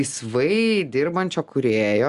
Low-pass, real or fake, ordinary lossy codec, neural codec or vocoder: 10.8 kHz; real; Opus, 64 kbps; none